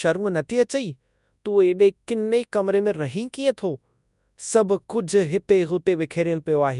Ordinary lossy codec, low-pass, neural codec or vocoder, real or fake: none; 10.8 kHz; codec, 24 kHz, 0.9 kbps, WavTokenizer, large speech release; fake